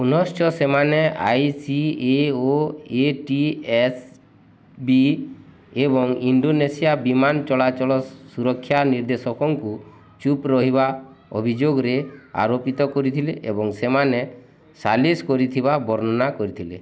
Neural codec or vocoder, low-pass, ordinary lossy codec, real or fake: none; none; none; real